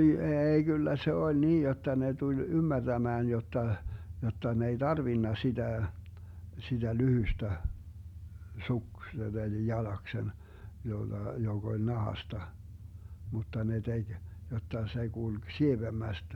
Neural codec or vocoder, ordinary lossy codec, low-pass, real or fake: none; MP3, 96 kbps; 19.8 kHz; real